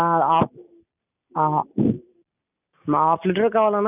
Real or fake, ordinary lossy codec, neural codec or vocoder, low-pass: real; none; none; 3.6 kHz